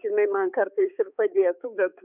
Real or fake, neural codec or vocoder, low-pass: fake; codec, 16 kHz, 4 kbps, X-Codec, HuBERT features, trained on balanced general audio; 3.6 kHz